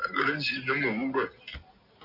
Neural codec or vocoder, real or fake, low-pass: none; real; 5.4 kHz